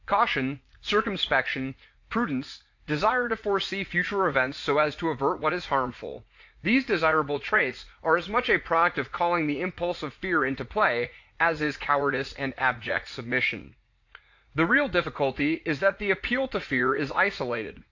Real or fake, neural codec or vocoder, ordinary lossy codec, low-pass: fake; vocoder, 44.1 kHz, 80 mel bands, Vocos; AAC, 48 kbps; 7.2 kHz